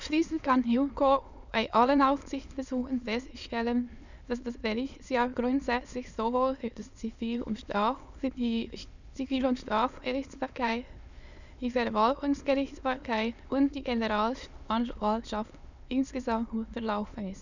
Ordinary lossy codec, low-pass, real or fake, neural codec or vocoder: none; 7.2 kHz; fake; autoencoder, 22.05 kHz, a latent of 192 numbers a frame, VITS, trained on many speakers